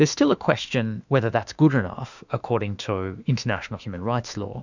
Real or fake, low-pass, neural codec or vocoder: fake; 7.2 kHz; autoencoder, 48 kHz, 32 numbers a frame, DAC-VAE, trained on Japanese speech